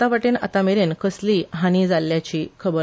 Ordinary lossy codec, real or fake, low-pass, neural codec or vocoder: none; real; none; none